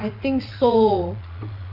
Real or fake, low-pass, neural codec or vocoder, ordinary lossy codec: fake; 5.4 kHz; vocoder, 22.05 kHz, 80 mel bands, Vocos; AAC, 48 kbps